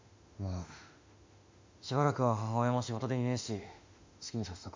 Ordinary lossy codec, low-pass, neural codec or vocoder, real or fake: MP3, 64 kbps; 7.2 kHz; autoencoder, 48 kHz, 32 numbers a frame, DAC-VAE, trained on Japanese speech; fake